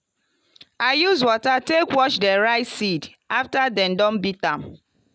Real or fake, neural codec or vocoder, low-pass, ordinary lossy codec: real; none; none; none